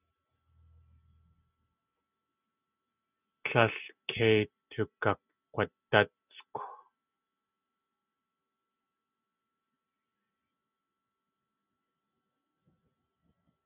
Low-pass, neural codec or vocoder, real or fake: 3.6 kHz; none; real